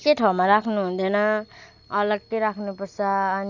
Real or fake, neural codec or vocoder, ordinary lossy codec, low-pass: real; none; none; 7.2 kHz